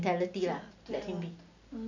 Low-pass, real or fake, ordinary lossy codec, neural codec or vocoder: 7.2 kHz; real; none; none